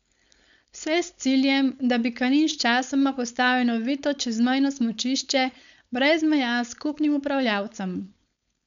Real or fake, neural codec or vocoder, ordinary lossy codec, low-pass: fake; codec, 16 kHz, 4.8 kbps, FACodec; none; 7.2 kHz